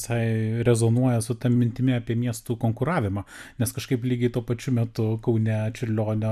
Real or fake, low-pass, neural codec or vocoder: real; 14.4 kHz; none